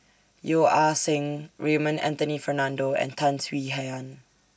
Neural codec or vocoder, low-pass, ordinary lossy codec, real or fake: none; none; none; real